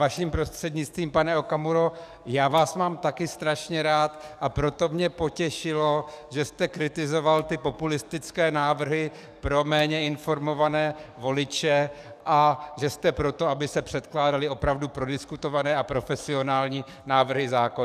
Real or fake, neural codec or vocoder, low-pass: fake; codec, 44.1 kHz, 7.8 kbps, DAC; 14.4 kHz